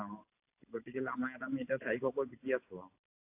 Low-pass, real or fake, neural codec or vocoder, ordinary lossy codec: 3.6 kHz; real; none; AAC, 24 kbps